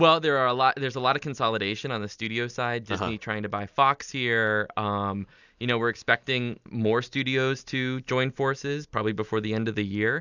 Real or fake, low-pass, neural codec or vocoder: real; 7.2 kHz; none